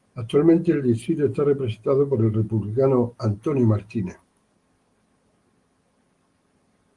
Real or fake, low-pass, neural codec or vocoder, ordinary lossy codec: real; 10.8 kHz; none; Opus, 24 kbps